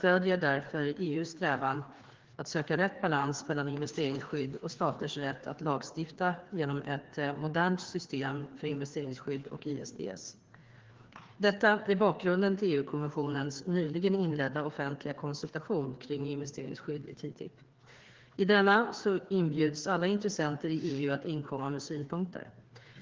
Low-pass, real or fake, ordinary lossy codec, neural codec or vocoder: 7.2 kHz; fake; Opus, 16 kbps; codec, 16 kHz, 2 kbps, FreqCodec, larger model